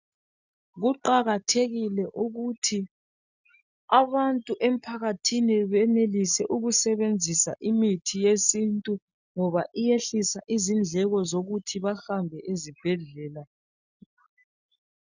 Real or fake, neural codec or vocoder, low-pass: real; none; 7.2 kHz